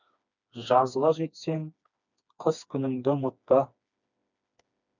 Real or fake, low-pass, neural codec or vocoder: fake; 7.2 kHz; codec, 16 kHz, 2 kbps, FreqCodec, smaller model